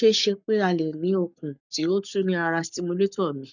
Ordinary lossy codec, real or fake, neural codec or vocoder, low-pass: none; fake; codec, 44.1 kHz, 7.8 kbps, Pupu-Codec; 7.2 kHz